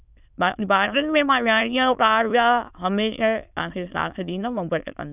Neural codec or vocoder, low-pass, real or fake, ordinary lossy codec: autoencoder, 22.05 kHz, a latent of 192 numbers a frame, VITS, trained on many speakers; 3.6 kHz; fake; none